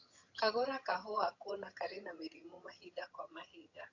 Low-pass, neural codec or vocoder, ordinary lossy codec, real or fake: 7.2 kHz; vocoder, 22.05 kHz, 80 mel bands, HiFi-GAN; AAC, 48 kbps; fake